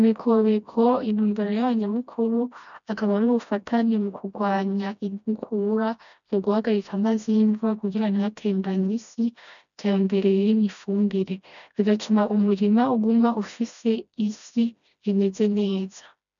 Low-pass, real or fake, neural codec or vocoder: 7.2 kHz; fake; codec, 16 kHz, 1 kbps, FreqCodec, smaller model